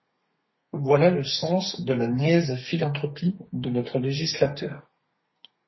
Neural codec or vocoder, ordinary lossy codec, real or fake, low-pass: codec, 32 kHz, 1.9 kbps, SNAC; MP3, 24 kbps; fake; 7.2 kHz